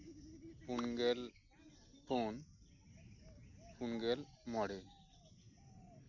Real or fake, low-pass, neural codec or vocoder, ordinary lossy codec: real; 7.2 kHz; none; none